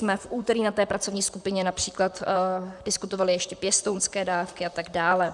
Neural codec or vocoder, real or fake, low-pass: vocoder, 44.1 kHz, 128 mel bands, Pupu-Vocoder; fake; 10.8 kHz